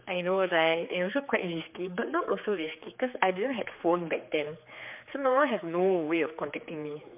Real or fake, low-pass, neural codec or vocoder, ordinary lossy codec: fake; 3.6 kHz; codec, 16 kHz, 4 kbps, X-Codec, HuBERT features, trained on general audio; MP3, 32 kbps